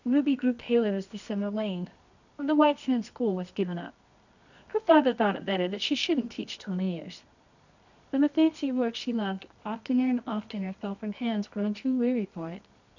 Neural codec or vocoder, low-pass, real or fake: codec, 24 kHz, 0.9 kbps, WavTokenizer, medium music audio release; 7.2 kHz; fake